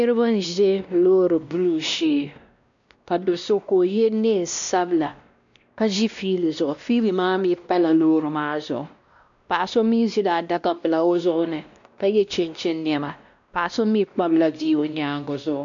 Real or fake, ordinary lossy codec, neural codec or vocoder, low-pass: fake; MP3, 64 kbps; codec, 16 kHz, 1 kbps, X-Codec, WavLM features, trained on Multilingual LibriSpeech; 7.2 kHz